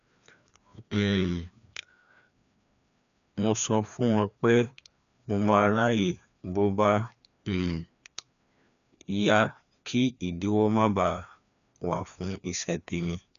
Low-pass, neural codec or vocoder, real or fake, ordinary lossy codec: 7.2 kHz; codec, 16 kHz, 2 kbps, FreqCodec, larger model; fake; none